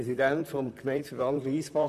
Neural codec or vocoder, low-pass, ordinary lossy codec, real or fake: vocoder, 44.1 kHz, 128 mel bands, Pupu-Vocoder; 14.4 kHz; none; fake